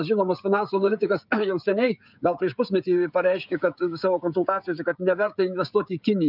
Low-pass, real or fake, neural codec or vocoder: 5.4 kHz; fake; codec, 16 kHz, 16 kbps, FreqCodec, smaller model